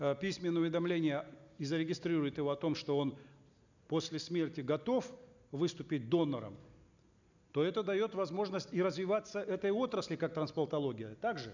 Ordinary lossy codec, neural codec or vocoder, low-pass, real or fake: none; none; 7.2 kHz; real